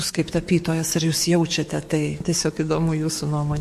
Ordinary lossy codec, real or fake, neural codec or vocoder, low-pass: MP3, 64 kbps; fake; codec, 44.1 kHz, 7.8 kbps, DAC; 14.4 kHz